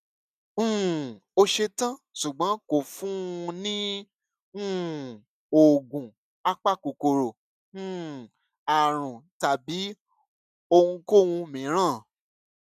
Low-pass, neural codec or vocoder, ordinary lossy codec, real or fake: 14.4 kHz; none; none; real